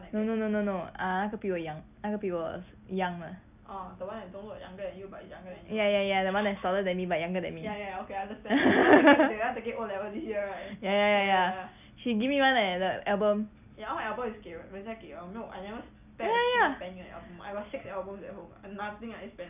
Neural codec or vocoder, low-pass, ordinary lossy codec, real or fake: none; 3.6 kHz; none; real